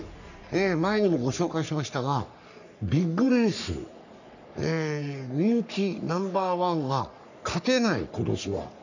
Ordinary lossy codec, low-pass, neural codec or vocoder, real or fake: none; 7.2 kHz; codec, 44.1 kHz, 3.4 kbps, Pupu-Codec; fake